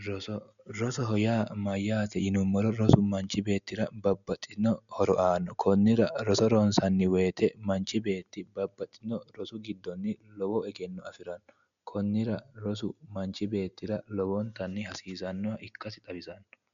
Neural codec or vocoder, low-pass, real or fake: none; 7.2 kHz; real